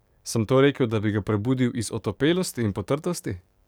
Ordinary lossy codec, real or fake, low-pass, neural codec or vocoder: none; fake; none; codec, 44.1 kHz, 7.8 kbps, DAC